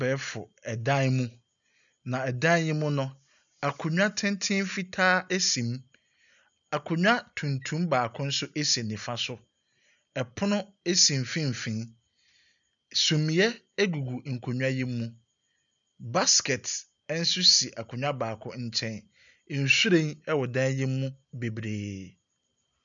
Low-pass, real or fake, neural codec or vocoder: 7.2 kHz; real; none